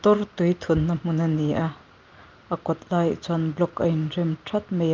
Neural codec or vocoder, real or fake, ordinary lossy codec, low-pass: none; real; Opus, 32 kbps; 7.2 kHz